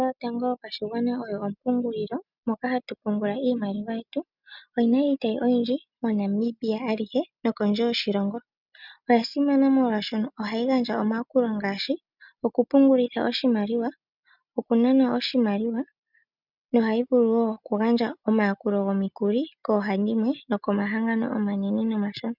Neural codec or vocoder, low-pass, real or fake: none; 5.4 kHz; real